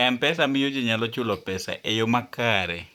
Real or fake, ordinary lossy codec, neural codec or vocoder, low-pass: fake; none; vocoder, 44.1 kHz, 128 mel bands, Pupu-Vocoder; 19.8 kHz